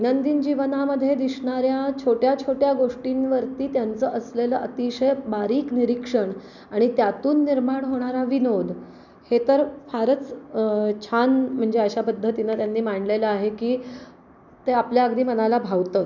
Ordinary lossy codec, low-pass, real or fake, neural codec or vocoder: none; 7.2 kHz; real; none